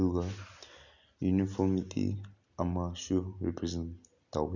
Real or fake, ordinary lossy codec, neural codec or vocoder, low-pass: real; none; none; 7.2 kHz